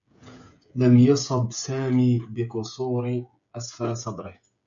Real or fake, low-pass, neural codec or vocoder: fake; 7.2 kHz; codec, 16 kHz, 16 kbps, FreqCodec, smaller model